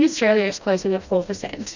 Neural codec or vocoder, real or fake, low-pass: codec, 16 kHz, 1 kbps, FreqCodec, smaller model; fake; 7.2 kHz